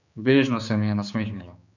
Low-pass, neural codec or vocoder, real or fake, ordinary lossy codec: 7.2 kHz; codec, 16 kHz, 4 kbps, X-Codec, HuBERT features, trained on general audio; fake; none